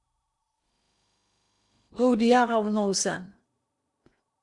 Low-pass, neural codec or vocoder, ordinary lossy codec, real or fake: 10.8 kHz; codec, 16 kHz in and 24 kHz out, 0.8 kbps, FocalCodec, streaming, 65536 codes; Opus, 64 kbps; fake